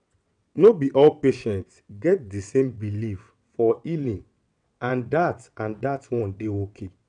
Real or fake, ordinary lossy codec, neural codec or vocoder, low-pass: fake; none; vocoder, 22.05 kHz, 80 mel bands, WaveNeXt; 9.9 kHz